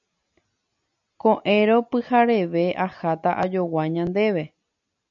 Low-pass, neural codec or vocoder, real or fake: 7.2 kHz; none; real